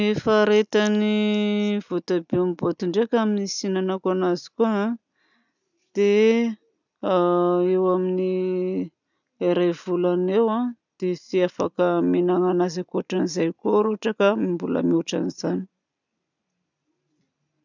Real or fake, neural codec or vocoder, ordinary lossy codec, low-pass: real; none; none; 7.2 kHz